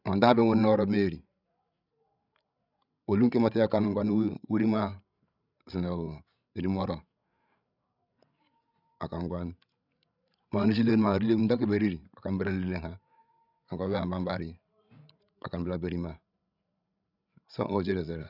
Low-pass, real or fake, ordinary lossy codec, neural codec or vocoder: 5.4 kHz; fake; none; codec, 16 kHz, 16 kbps, FreqCodec, larger model